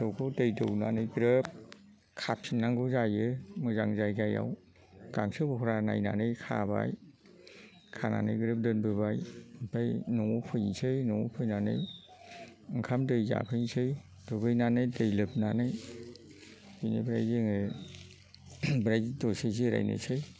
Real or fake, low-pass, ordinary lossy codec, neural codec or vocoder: real; none; none; none